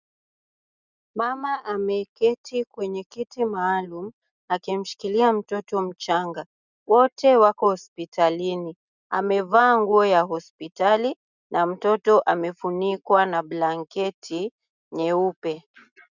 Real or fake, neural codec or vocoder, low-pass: real; none; 7.2 kHz